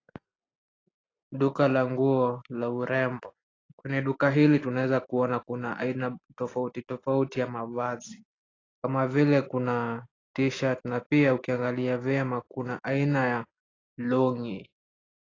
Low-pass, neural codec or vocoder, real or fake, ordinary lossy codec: 7.2 kHz; none; real; AAC, 32 kbps